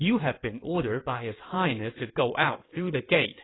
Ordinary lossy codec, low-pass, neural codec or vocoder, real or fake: AAC, 16 kbps; 7.2 kHz; codec, 16 kHz in and 24 kHz out, 2.2 kbps, FireRedTTS-2 codec; fake